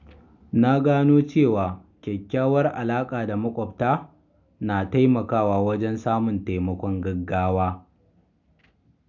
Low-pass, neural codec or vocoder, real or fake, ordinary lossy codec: 7.2 kHz; none; real; none